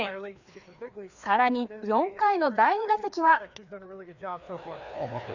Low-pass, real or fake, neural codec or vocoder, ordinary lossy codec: 7.2 kHz; fake; codec, 16 kHz, 2 kbps, FreqCodec, larger model; none